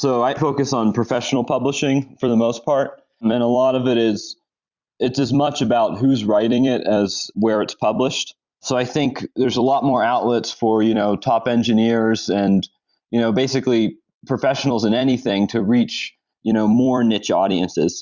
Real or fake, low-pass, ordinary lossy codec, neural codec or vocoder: fake; 7.2 kHz; Opus, 64 kbps; vocoder, 44.1 kHz, 128 mel bands every 256 samples, BigVGAN v2